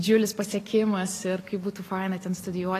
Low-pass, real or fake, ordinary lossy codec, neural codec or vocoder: 14.4 kHz; real; AAC, 48 kbps; none